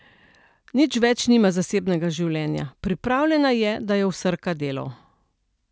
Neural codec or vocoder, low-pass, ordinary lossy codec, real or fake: none; none; none; real